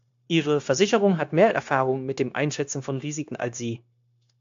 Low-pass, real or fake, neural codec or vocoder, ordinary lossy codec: 7.2 kHz; fake; codec, 16 kHz, 0.9 kbps, LongCat-Audio-Codec; AAC, 64 kbps